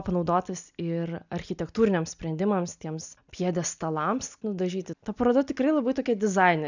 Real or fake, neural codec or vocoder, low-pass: real; none; 7.2 kHz